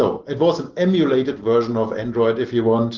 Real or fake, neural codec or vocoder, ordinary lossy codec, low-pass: real; none; Opus, 16 kbps; 7.2 kHz